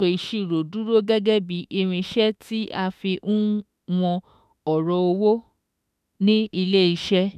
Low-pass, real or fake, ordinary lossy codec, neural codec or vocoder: 14.4 kHz; fake; none; autoencoder, 48 kHz, 32 numbers a frame, DAC-VAE, trained on Japanese speech